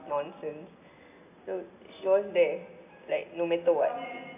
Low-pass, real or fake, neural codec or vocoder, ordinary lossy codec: 3.6 kHz; real; none; AAC, 32 kbps